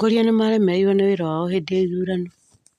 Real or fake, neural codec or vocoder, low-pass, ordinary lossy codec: real; none; 14.4 kHz; none